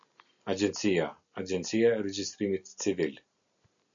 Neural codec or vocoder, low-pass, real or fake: none; 7.2 kHz; real